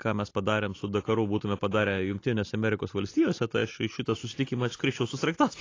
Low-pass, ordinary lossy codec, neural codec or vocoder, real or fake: 7.2 kHz; AAC, 32 kbps; none; real